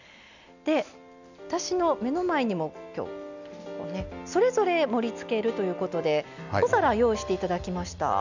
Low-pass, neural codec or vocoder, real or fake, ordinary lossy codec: 7.2 kHz; none; real; none